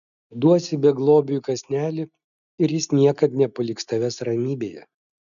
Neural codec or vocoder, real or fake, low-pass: none; real; 7.2 kHz